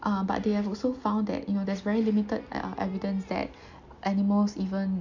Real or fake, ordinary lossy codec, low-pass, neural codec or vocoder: real; none; 7.2 kHz; none